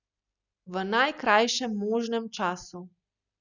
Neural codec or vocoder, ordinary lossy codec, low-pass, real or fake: none; none; 7.2 kHz; real